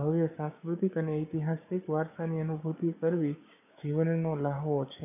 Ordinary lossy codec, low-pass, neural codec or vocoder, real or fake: none; 3.6 kHz; codec, 16 kHz, 6 kbps, DAC; fake